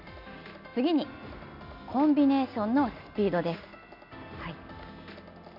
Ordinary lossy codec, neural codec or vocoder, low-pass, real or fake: none; none; 5.4 kHz; real